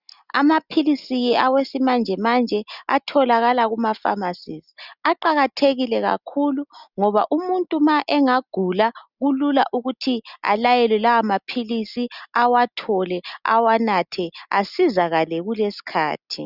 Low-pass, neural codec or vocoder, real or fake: 5.4 kHz; none; real